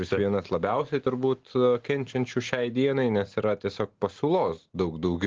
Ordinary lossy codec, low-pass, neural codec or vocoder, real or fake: Opus, 16 kbps; 7.2 kHz; none; real